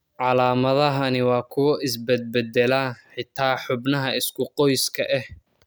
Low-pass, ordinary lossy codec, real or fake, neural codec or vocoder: none; none; real; none